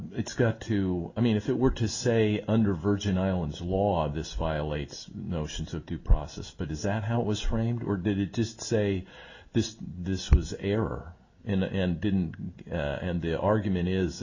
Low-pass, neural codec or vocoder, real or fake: 7.2 kHz; none; real